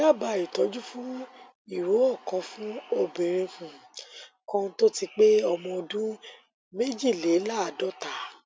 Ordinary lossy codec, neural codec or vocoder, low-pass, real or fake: none; none; none; real